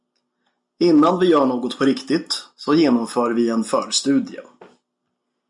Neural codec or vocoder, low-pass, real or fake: none; 10.8 kHz; real